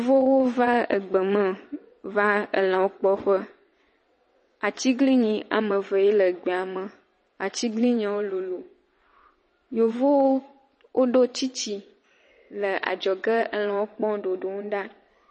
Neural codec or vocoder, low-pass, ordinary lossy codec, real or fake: vocoder, 22.05 kHz, 80 mel bands, WaveNeXt; 9.9 kHz; MP3, 32 kbps; fake